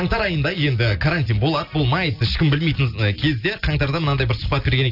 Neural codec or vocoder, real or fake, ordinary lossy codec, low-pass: none; real; AAC, 48 kbps; 5.4 kHz